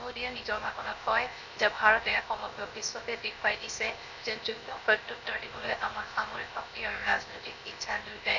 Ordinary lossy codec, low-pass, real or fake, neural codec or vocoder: none; 7.2 kHz; fake; codec, 16 kHz, 0.7 kbps, FocalCodec